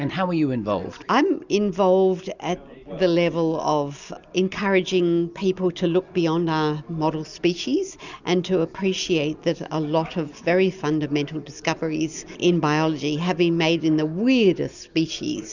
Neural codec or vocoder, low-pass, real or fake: none; 7.2 kHz; real